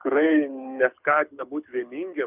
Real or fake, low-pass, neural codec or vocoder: fake; 3.6 kHz; codec, 24 kHz, 6 kbps, HILCodec